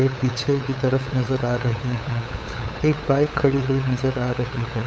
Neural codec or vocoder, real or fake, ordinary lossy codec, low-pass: codec, 16 kHz, 8 kbps, FunCodec, trained on LibriTTS, 25 frames a second; fake; none; none